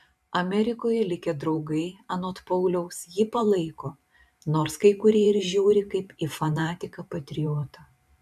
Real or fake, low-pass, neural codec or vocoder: fake; 14.4 kHz; vocoder, 44.1 kHz, 128 mel bands every 512 samples, BigVGAN v2